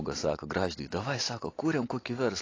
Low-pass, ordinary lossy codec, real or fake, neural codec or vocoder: 7.2 kHz; AAC, 32 kbps; real; none